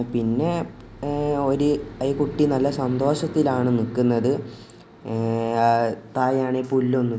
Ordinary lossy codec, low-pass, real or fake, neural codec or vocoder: none; none; real; none